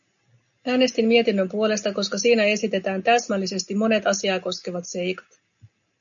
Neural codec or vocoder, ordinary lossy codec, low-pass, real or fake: none; MP3, 96 kbps; 7.2 kHz; real